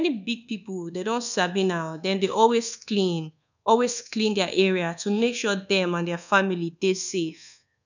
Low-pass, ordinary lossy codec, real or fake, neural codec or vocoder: 7.2 kHz; none; fake; codec, 24 kHz, 1.2 kbps, DualCodec